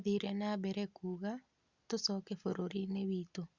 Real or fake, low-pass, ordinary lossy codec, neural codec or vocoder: real; 7.2 kHz; Opus, 64 kbps; none